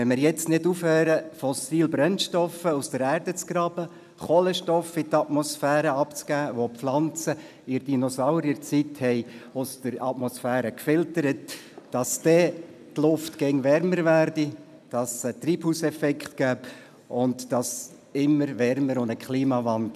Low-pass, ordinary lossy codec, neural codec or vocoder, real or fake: 14.4 kHz; none; none; real